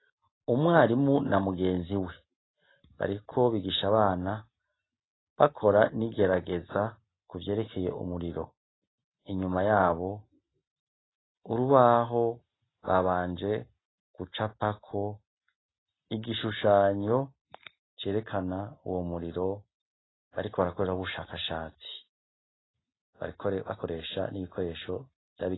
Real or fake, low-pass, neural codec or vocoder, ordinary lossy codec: real; 7.2 kHz; none; AAC, 16 kbps